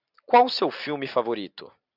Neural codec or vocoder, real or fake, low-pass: none; real; 5.4 kHz